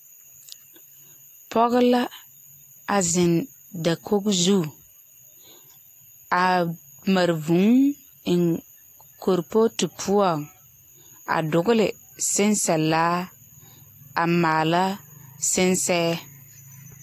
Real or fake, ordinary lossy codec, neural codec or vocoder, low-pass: real; AAC, 48 kbps; none; 14.4 kHz